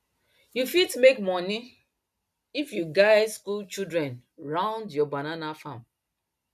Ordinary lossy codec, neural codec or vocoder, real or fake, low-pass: none; none; real; 14.4 kHz